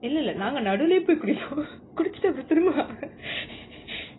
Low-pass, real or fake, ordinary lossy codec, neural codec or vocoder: 7.2 kHz; real; AAC, 16 kbps; none